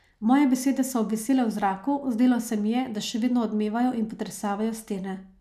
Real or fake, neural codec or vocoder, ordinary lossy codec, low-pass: real; none; none; 14.4 kHz